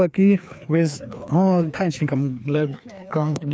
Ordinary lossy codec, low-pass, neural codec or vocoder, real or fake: none; none; codec, 16 kHz, 2 kbps, FreqCodec, larger model; fake